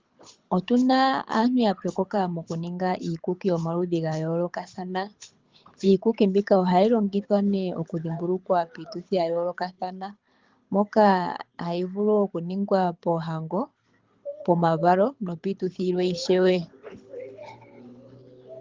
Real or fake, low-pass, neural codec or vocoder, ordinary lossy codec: fake; 7.2 kHz; codec, 24 kHz, 6 kbps, HILCodec; Opus, 32 kbps